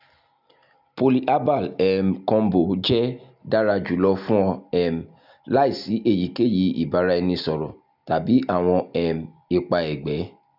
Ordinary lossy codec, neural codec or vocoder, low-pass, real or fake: none; none; 5.4 kHz; real